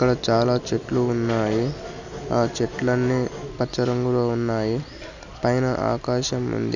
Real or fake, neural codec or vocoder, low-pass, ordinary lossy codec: real; none; 7.2 kHz; none